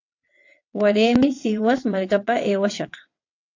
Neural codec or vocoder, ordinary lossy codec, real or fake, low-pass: vocoder, 22.05 kHz, 80 mel bands, WaveNeXt; AAC, 48 kbps; fake; 7.2 kHz